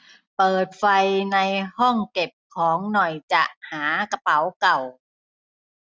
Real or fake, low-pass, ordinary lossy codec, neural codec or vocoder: real; none; none; none